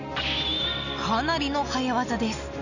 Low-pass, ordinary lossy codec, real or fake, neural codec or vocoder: 7.2 kHz; none; real; none